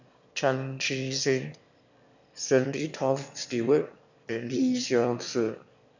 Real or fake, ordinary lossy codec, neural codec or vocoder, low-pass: fake; none; autoencoder, 22.05 kHz, a latent of 192 numbers a frame, VITS, trained on one speaker; 7.2 kHz